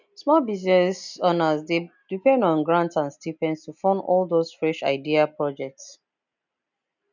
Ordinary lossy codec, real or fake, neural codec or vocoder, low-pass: none; real; none; 7.2 kHz